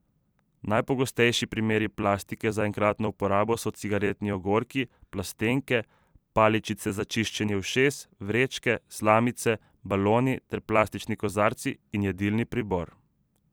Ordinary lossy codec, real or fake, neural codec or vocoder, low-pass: none; fake; vocoder, 44.1 kHz, 128 mel bands every 256 samples, BigVGAN v2; none